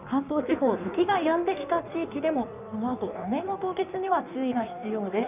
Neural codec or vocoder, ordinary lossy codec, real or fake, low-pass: codec, 16 kHz in and 24 kHz out, 1.1 kbps, FireRedTTS-2 codec; none; fake; 3.6 kHz